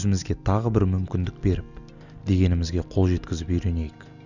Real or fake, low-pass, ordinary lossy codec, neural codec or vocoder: real; 7.2 kHz; none; none